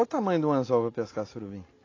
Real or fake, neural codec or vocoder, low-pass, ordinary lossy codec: real; none; 7.2 kHz; AAC, 32 kbps